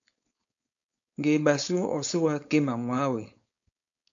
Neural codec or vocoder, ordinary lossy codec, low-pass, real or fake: codec, 16 kHz, 4.8 kbps, FACodec; AAC, 64 kbps; 7.2 kHz; fake